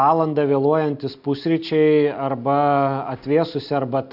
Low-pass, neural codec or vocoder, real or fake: 5.4 kHz; none; real